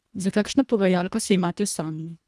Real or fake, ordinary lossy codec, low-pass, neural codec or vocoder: fake; none; none; codec, 24 kHz, 1.5 kbps, HILCodec